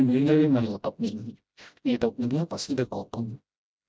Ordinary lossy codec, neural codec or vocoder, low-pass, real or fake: none; codec, 16 kHz, 0.5 kbps, FreqCodec, smaller model; none; fake